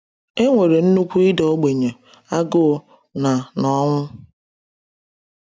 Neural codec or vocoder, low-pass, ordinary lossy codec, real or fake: none; none; none; real